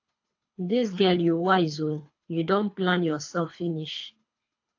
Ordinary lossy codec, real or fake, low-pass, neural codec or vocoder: AAC, 48 kbps; fake; 7.2 kHz; codec, 24 kHz, 3 kbps, HILCodec